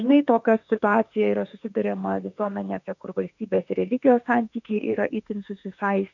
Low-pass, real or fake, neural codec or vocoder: 7.2 kHz; fake; autoencoder, 48 kHz, 32 numbers a frame, DAC-VAE, trained on Japanese speech